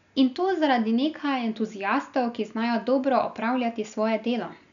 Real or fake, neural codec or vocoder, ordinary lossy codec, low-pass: real; none; none; 7.2 kHz